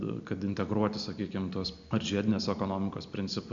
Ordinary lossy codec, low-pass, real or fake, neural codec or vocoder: MP3, 64 kbps; 7.2 kHz; real; none